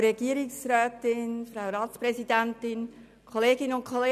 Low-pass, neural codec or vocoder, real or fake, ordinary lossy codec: 14.4 kHz; none; real; none